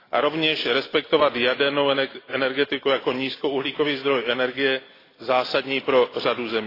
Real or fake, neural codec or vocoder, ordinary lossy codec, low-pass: real; none; AAC, 24 kbps; 5.4 kHz